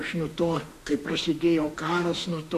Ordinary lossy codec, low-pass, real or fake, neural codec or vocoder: MP3, 64 kbps; 14.4 kHz; fake; autoencoder, 48 kHz, 32 numbers a frame, DAC-VAE, trained on Japanese speech